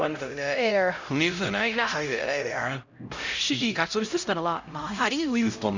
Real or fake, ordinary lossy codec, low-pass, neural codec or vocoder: fake; Opus, 64 kbps; 7.2 kHz; codec, 16 kHz, 0.5 kbps, X-Codec, HuBERT features, trained on LibriSpeech